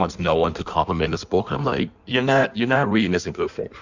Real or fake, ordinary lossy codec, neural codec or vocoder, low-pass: fake; Opus, 64 kbps; codec, 16 kHz in and 24 kHz out, 1.1 kbps, FireRedTTS-2 codec; 7.2 kHz